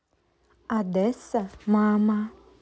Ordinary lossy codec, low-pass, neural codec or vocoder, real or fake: none; none; none; real